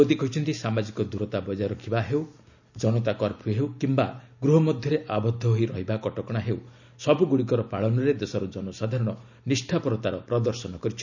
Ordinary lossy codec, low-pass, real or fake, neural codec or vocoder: none; 7.2 kHz; real; none